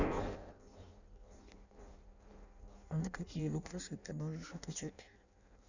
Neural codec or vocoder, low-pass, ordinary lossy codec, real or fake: codec, 16 kHz in and 24 kHz out, 0.6 kbps, FireRedTTS-2 codec; 7.2 kHz; none; fake